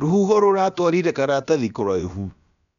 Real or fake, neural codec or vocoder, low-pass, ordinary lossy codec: fake; codec, 16 kHz, about 1 kbps, DyCAST, with the encoder's durations; 7.2 kHz; MP3, 96 kbps